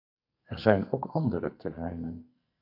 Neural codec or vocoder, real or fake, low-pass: codec, 44.1 kHz, 2.6 kbps, SNAC; fake; 5.4 kHz